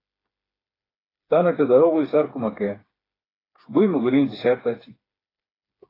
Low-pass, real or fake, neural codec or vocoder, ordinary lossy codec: 5.4 kHz; fake; codec, 16 kHz, 4 kbps, FreqCodec, smaller model; AAC, 24 kbps